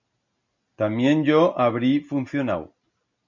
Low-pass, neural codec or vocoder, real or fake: 7.2 kHz; none; real